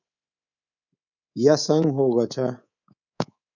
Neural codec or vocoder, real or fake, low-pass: codec, 24 kHz, 3.1 kbps, DualCodec; fake; 7.2 kHz